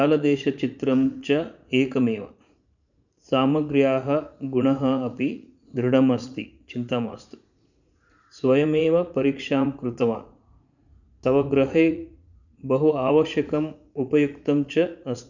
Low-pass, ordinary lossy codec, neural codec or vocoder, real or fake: 7.2 kHz; none; vocoder, 44.1 kHz, 80 mel bands, Vocos; fake